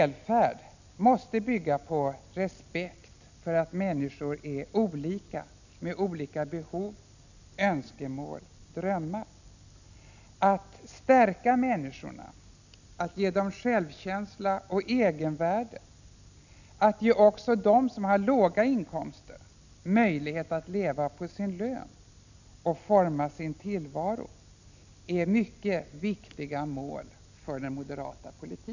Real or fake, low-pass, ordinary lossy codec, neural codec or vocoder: real; 7.2 kHz; none; none